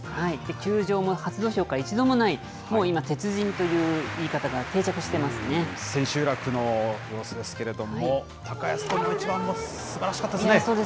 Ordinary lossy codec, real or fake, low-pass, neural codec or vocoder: none; real; none; none